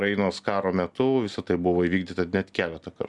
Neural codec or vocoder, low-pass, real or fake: none; 10.8 kHz; real